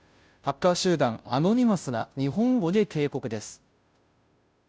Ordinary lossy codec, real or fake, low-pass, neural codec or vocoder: none; fake; none; codec, 16 kHz, 0.5 kbps, FunCodec, trained on Chinese and English, 25 frames a second